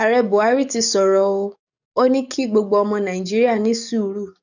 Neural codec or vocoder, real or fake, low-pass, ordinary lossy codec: codec, 44.1 kHz, 7.8 kbps, DAC; fake; 7.2 kHz; none